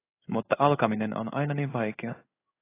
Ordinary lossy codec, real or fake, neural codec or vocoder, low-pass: AAC, 24 kbps; fake; codec, 16 kHz in and 24 kHz out, 1 kbps, XY-Tokenizer; 3.6 kHz